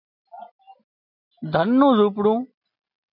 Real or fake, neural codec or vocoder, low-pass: real; none; 5.4 kHz